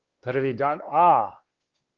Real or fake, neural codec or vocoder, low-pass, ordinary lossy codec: fake; codec, 16 kHz, 2 kbps, X-Codec, WavLM features, trained on Multilingual LibriSpeech; 7.2 kHz; Opus, 16 kbps